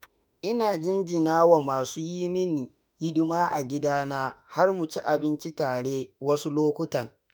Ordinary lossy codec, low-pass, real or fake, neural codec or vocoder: none; none; fake; autoencoder, 48 kHz, 32 numbers a frame, DAC-VAE, trained on Japanese speech